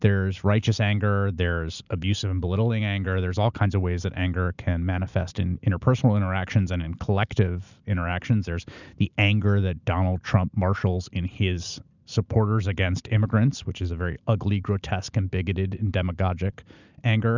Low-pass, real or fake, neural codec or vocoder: 7.2 kHz; real; none